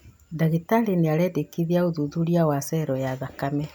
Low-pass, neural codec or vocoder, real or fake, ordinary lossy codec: 19.8 kHz; none; real; none